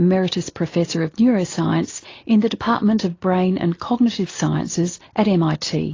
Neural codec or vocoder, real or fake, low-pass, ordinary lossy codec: none; real; 7.2 kHz; AAC, 32 kbps